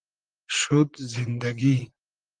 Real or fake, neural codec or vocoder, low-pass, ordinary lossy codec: fake; vocoder, 44.1 kHz, 128 mel bands, Pupu-Vocoder; 9.9 kHz; Opus, 24 kbps